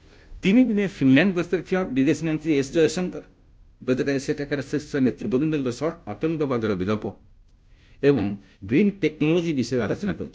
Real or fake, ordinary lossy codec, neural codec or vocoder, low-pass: fake; none; codec, 16 kHz, 0.5 kbps, FunCodec, trained on Chinese and English, 25 frames a second; none